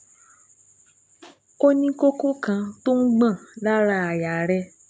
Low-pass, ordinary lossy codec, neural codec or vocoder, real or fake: none; none; none; real